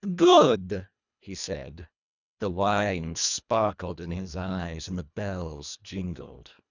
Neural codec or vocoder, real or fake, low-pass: codec, 24 kHz, 1.5 kbps, HILCodec; fake; 7.2 kHz